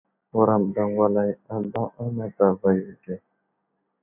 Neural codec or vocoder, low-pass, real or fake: none; 3.6 kHz; real